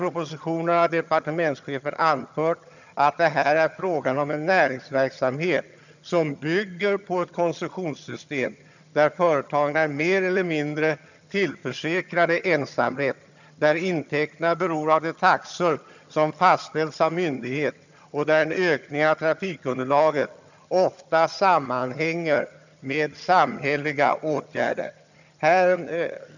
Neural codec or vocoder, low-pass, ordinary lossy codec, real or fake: vocoder, 22.05 kHz, 80 mel bands, HiFi-GAN; 7.2 kHz; none; fake